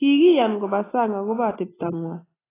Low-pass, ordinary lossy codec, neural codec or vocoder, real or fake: 3.6 kHz; AAC, 16 kbps; none; real